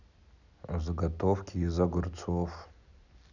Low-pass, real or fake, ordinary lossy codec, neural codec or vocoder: 7.2 kHz; real; none; none